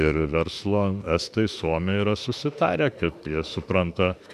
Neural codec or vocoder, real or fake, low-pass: autoencoder, 48 kHz, 32 numbers a frame, DAC-VAE, trained on Japanese speech; fake; 14.4 kHz